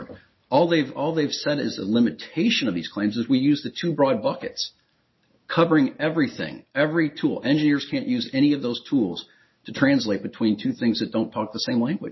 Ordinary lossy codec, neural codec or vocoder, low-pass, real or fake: MP3, 24 kbps; none; 7.2 kHz; real